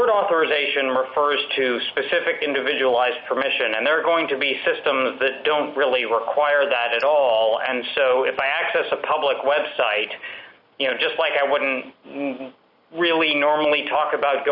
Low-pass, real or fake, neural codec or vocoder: 5.4 kHz; real; none